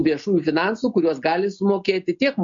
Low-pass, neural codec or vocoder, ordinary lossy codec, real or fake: 7.2 kHz; none; MP3, 48 kbps; real